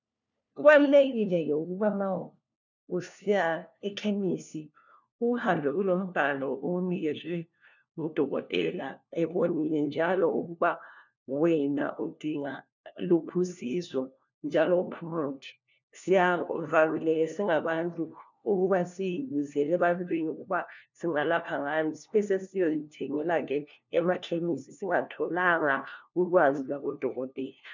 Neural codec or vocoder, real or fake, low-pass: codec, 16 kHz, 1 kbps, FunCodec, trained on LibriTTS, 50 frames a second; fake; 7.2 kHz